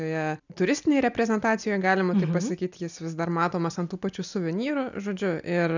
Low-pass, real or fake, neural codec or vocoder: 7.2 kHz; real; none